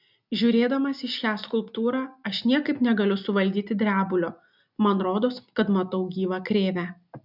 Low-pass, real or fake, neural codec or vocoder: 5.4 kHz; real; none